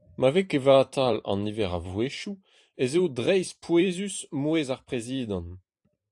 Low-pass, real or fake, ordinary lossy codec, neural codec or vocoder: 10.8 kHz; fake; AAC, 64 kbps; vocoder, 44.1 kHz, 128 mel bands every 512 samples, BigVGAN v2